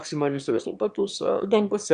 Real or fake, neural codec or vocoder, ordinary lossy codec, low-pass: fake; autoencoder, 22.05 kHz, a latent of 192 numbers a frame, VITS, trained on one speaker; Opus, 64 kbps; 9.9 kHz